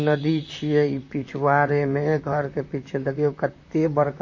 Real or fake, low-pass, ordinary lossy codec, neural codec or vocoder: fake; 7.2 kHz; MP3, 32 kbps; vocoder, 44.1 kHz, 128 mel bands, Pupu-Vocoder